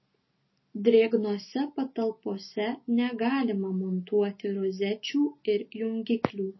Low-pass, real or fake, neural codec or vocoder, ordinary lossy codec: 7.2 kHz; real; none; MP3, 24 kbps